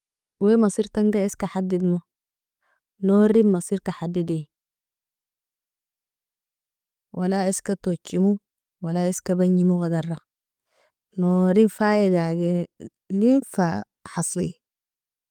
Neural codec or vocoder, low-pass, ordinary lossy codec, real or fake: codec, 44.1 kHz, 7.8 kbps, DAC; 19.8 kHz; Opus, 32 kbps; fake